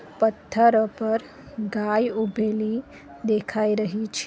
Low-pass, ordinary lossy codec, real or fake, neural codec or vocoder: none; none; real; none